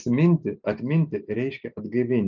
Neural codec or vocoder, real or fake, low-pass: none; real; 7.2 kHz